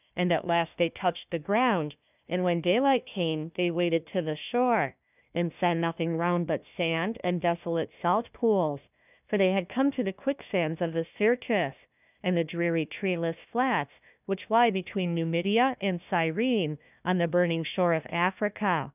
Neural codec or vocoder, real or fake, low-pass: codec, 16 kHz, 1 kbps, FunCodec, trained on Chinese and English, 50 frames a second; fake; 3.6 kHz